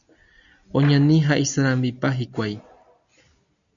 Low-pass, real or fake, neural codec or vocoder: 7.2 kHz; real; none